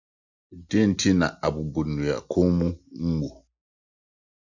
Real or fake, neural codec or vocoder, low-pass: real; none; 7.2 kHz